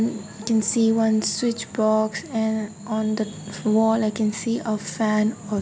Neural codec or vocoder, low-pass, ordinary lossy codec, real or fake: none; none; none; real